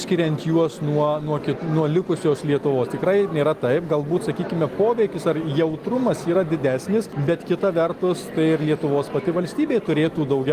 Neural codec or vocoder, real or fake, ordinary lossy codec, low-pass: none; real; Opus, 24 kbps; 14.4 kHz